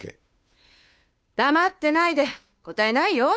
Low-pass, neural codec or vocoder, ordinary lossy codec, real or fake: none; codec, 16 kHz, 2 kbps, FunCodec, trained on Chinese and English, 25 frames a second; none; fake